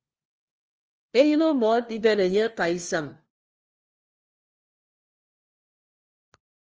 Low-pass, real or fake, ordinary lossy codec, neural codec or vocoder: 7.2 kHz; fake; Opus, 24 kbps; codec, 16 kHz, 1 kbps, FunCodec, trained on LibriTTS, 50 frames a second